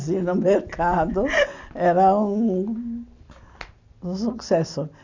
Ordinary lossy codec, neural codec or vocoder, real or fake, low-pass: none; none; real; 7.2 kHz